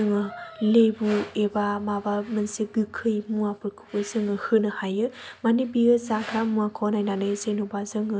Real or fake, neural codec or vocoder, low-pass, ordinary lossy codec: real; none; none; none